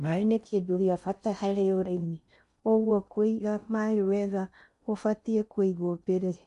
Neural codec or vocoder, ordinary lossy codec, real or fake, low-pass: codec, 16 kHz in and 24 kHz out, 0.6 kbps, FocalCodec, streaming, 4096 codes; MP3, 96 kbps; fake; 10.8 kHz